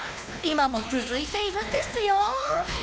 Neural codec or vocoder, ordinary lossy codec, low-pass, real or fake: codec, 16 kHz, 2 kbps, X-Codec, WavLM features, trained on Multilingual LibriSpeech; none; none; fake